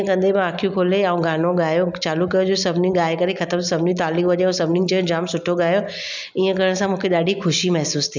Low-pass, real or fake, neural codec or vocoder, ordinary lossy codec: 7.2 kHz; real; none; none